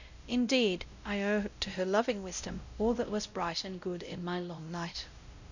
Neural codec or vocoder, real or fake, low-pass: codec, 16 kHz, 0.5 kbps, X-Codec, WavLM features, trained on Multilingual LibriSpeech; fake; 7.2 kHz